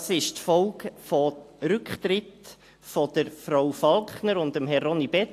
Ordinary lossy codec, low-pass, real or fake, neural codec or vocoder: AAC, 64 kbps; 14.4 kHz; real; none